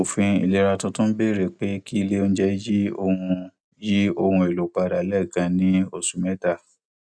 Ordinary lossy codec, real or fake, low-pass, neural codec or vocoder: none; real; none; none